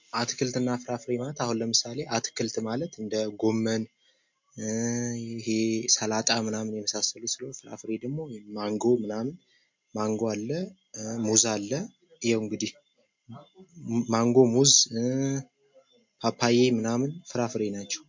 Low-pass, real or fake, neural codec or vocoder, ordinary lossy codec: 7.2 kHz; real; none; MP3, 48 kbps